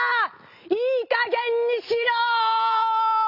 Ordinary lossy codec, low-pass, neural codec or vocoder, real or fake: none; 5.4 kHz; none; real